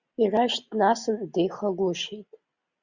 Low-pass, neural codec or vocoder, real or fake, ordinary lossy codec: 7.2 kHz; none; real; Opus, 64 kbps